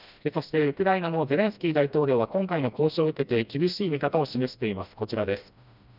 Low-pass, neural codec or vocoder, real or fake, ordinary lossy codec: 5.4 kHz; codec, 16 kHz, 1 kbps, FreqCodec, smaller model; fake; none